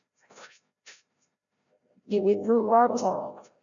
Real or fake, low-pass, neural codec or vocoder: fake; 7.2 kHz; codec, 16 kHz, 0.5 kbps, FreqCodec, larger model